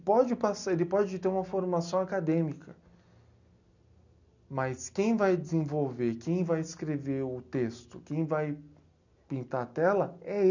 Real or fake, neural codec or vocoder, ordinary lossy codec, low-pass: real; none; none; 7.2 kHz